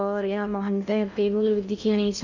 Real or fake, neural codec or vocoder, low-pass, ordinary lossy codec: fake; codec, 16 kHz in and 24 kHz out, 0.6 kbps, FocalCodec, streaming, 2048 codes; 7.2 kHz; none